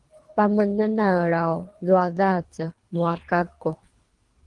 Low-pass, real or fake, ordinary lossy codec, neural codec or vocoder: 10.8 kHz; fake; Opus, 24 kbps; codec, 24 kHz, 3 kbps, HILCodec